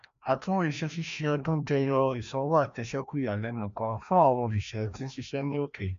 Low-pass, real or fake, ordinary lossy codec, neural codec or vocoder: 7.2 kHz; fake; MP3, 64 kbps; codec, 16 kHz, 1 kbps, FreqCodec, larger model